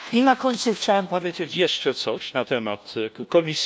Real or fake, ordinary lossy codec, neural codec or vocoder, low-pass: fake; none; codec, 16 kHz, 1 kbps, FunCodec, trained on LibriTTS, 50 frames a second; none